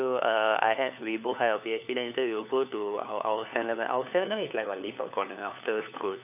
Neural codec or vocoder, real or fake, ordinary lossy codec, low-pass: codec, 16 kHz, 4 kbps, FunCodec, trained on LibriTTS, 50 frames a second; fake; none; 3.6 kHz